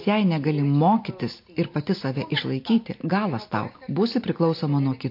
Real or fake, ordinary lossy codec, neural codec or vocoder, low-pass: real; MP3, 32 kbps; none; 5.4 kHz